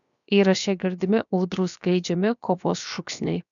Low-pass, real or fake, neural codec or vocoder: 7.2 kHz; fake; codec, 16 kHz, 0.7 kbps, FocalCodec